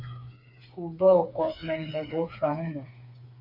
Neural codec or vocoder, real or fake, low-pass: codec, 16 kHz, 4 kbps, FreqCodec, smaller model; fake; 5.4 kHz